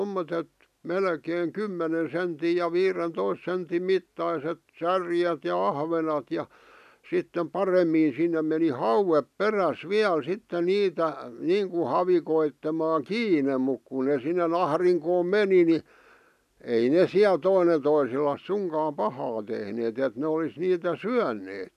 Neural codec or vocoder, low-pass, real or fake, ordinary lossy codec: none; 14.4 kHz; real; none